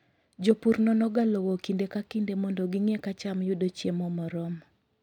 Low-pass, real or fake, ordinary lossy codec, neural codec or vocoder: 19.8 kHz; real; none; none